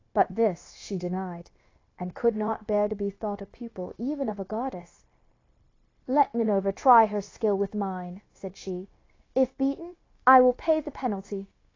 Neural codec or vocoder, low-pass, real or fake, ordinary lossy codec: codec, 16 kHz, 0.9 kbps, LongCat-Audio-Codec; 7.2 kHz; fake; AAC, 32 kbps